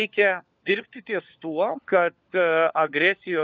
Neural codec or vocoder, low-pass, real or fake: codec, 16 kHz, 4 kbps, FunCodec, trained on LibriTTS, 50 frames a second; 7.2 kHz; fake